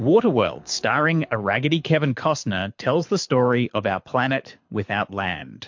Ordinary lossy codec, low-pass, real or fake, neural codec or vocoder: MP3, 48 kbps; 7.2 kHz; fake; codec, 24 kHz, 6 kbps, HILCodec